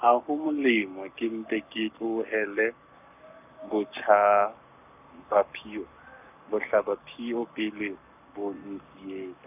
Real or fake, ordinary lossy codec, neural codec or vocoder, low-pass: fake; none; codec, 44.1 kHz, 7.8 kbps, Pupu-Codec; 3.6 kHz